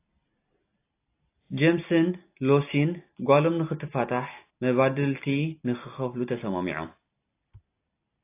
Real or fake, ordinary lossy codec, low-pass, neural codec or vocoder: real; AAC, 24 kbps; 3.6 kHz; none